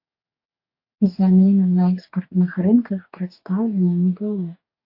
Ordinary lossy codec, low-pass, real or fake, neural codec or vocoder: AAC, 48 kbps; 5.4 kHz; fake; codec, 44.1 kHz, 2.6 kbps, DAC